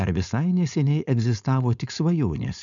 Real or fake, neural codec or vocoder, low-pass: fake; codec, 16 kHz, 4.8 kbps, FACodec; 7.2 kHz